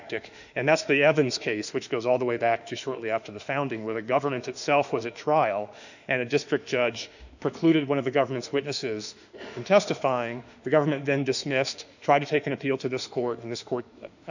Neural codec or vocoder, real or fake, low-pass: autoencoder, 48 kHz, 32 numbers a frame, DAC-VAE, trained on Japanese speech; fake; 7.2 kHz